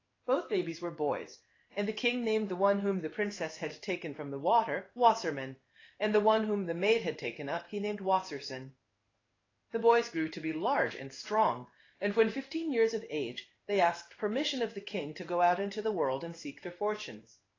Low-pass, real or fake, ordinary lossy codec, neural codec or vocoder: 7.2 kHz; fake; AAC, 32 kbps; codec, 16 kHz in and 24 kHz out, 1 kbps, XY-Tokenizer